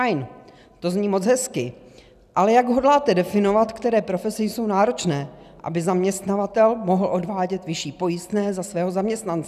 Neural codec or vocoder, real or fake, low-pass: none; real; 14.4 kHz